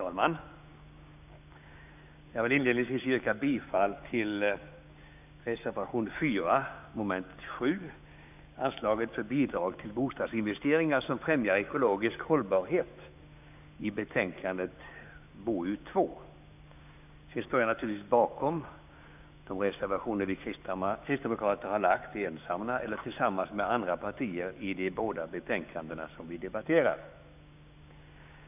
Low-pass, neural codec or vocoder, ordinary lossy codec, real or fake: 3.6 kHz; codec, 16 kHz, 6 kbps, DAC; none; fake